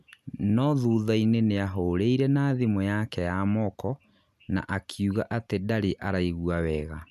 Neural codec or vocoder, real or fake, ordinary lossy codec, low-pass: none; real; none; 14.4 kHz